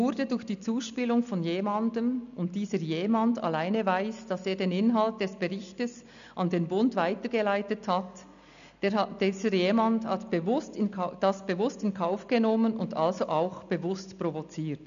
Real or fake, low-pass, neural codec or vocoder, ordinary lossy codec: real; 7.2 kHz; none; none